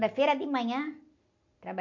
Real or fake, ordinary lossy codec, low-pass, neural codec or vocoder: real; none; 7.2 kHz; none